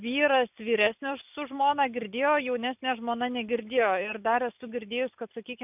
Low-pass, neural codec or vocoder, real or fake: 3.6 kHz; none; real